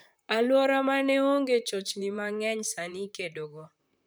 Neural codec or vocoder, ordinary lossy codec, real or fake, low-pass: vocoder, 44.1 kHz, 128 mel bands, Pupu-Vocoder; none; fake; none